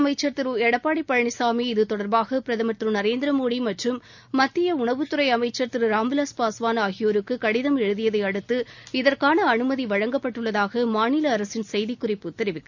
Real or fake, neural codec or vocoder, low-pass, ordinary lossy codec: real; none; 7.2 kHz; none